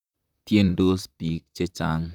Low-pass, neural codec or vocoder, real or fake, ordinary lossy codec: 19.8 kHz; vocoder, 44.1 kHz, 128 mel bands, Pupu-Vocoder; fake; none